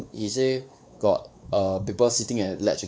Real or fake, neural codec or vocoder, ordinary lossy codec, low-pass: fake; codec, 16 kHz, 4 kbps, X-Codec, WavLM features, trained on Multilingual LibriSpeech; none; none